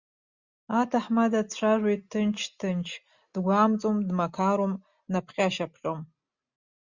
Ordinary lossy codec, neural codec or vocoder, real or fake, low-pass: Opus, 64 kbps; none; real; 7.2 kHz